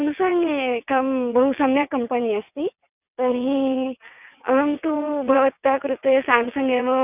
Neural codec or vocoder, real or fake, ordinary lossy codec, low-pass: vocoder, 22.05 kHz, 80 mel bands, WaveNeXt; fake; none; 3.6 kHz